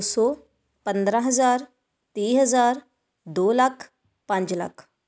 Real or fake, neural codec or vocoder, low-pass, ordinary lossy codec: real; none; none; none